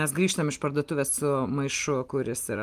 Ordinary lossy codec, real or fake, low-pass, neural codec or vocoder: Opus, 24 kbps; real; 14.4 kHz; none